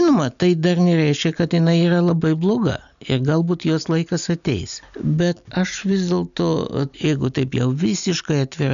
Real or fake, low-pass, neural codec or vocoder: real; 7.2 kHz; none